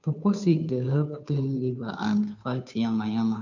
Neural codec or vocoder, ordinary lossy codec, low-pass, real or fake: codec, 16 kHz, 2 kbps, FunCodec, trained on Chinese and English, 25 frames a second; none; 7.2 kHz; fake